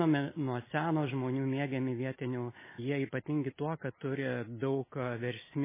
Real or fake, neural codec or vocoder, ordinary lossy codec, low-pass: real; none; MP3, 16 kbps; 3.6 kHz